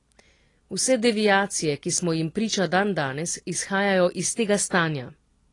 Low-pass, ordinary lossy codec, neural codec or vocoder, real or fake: 10.8 kHz; AAC, 32 kbps; none; real